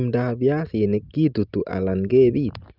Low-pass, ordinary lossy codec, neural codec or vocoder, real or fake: 5.4 kHz; Opus, 24 kbps; none; real